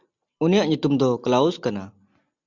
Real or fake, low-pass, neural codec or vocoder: real; 7.2 kHz; none